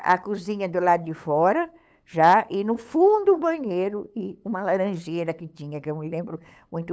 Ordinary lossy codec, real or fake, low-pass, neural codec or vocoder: none; fake; none; codec, 16 kHz, 8 kbps, FunCodec, trained on LibriTTS, 25 frames a second